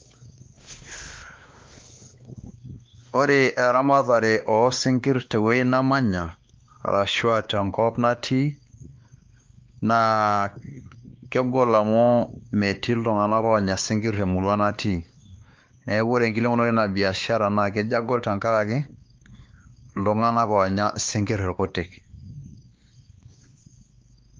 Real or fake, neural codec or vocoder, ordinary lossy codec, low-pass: fake; codec, 16 kHz, 2 kbps, X-Codec, WavLM features, trained on Multilingual LibriSpeech; Opus, 32 kbps; 7.2 kHz